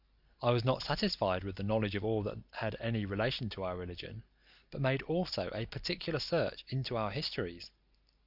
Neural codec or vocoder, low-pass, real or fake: none; 5.4 kHz; real